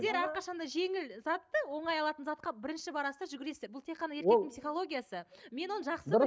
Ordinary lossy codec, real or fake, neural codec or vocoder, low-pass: none; real; none; none